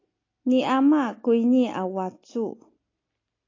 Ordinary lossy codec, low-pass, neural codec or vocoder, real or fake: AAC, 32 kbps; 7.2 kHz; none; real